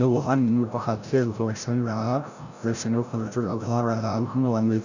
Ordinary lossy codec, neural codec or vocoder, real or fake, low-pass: none; codec, 16 kHz, 0.5 kbps, FreqCodec, larger model; fake; 7.2 kHz